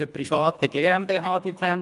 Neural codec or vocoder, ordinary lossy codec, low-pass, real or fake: codec, 24 kHz, 1.5 kbps, HILCodec; none; 10.8 kHz; fake